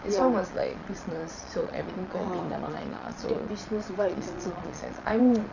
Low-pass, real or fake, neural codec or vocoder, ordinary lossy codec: 7.2 kHz; fake; vocoder, 22.05 kHz, 80 mel bands, Vocos; Opus, 64 kbps